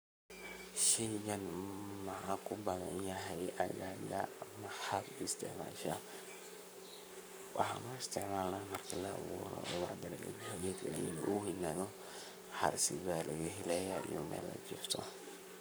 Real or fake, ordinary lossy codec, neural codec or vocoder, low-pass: fake; none; codec, 44.1 kHz, 7.8 kbps, Pupu-Codec; none